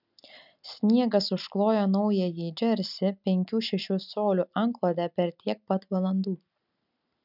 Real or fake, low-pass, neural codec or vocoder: real; 5.4 kHz; none